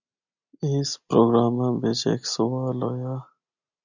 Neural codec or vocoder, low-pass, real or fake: none; 7.2 kHz; real